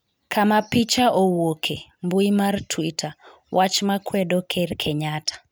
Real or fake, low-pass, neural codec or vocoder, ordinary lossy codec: real; none; none; none